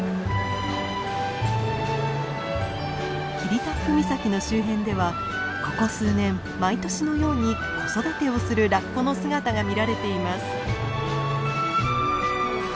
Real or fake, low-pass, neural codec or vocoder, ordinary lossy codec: real; none; none; none